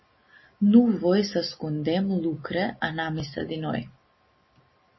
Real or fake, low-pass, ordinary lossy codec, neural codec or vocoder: real; 7.2 kHz; MP3, 24 kbps; none